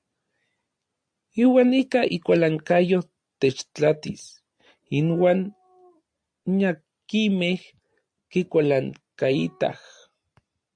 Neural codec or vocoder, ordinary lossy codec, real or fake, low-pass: none; AAC, 64 kbps; real; 9.9 kHz